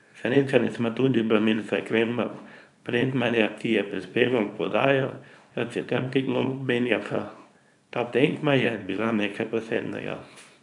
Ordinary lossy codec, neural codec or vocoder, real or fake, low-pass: none; codec, 24 kHz, 0.9 kbps, WavTokenizer, small release; fake; 10.8 kHz